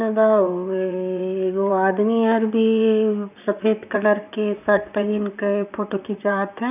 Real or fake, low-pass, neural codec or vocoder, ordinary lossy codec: fake; 3.6 kHz; vocoder, 44.1 kHz, 128 mel bands, Pupu-Vocoder; none